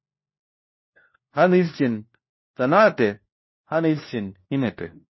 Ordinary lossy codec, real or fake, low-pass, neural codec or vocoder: MP3, 24 kbps; fake; 7.2 kHz; codec, 16 kHz, 1 kbps, FunCodec, trained on LibriTTS, 50 frames a second